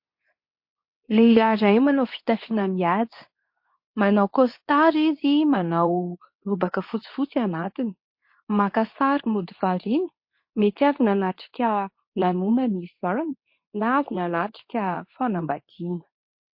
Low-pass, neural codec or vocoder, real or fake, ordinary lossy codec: 5.4 kHz; codec, 24 kHz, 0.9 kbps, WavTokenizer, medium speech release version 2; fake; MP3, 32 kbps